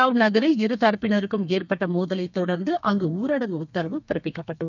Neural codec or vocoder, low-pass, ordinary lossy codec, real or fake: codec, 32 kHz, 1.9 kbps, SNAC; 7.2 kHz; none; fake